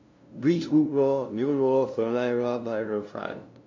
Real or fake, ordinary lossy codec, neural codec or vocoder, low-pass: fake; MP3, 48 kbps; codec, 16 kHz, 0.5 kbps, FunCodec, trained on LibriTTS, 25 frames a second; 7.2 kHz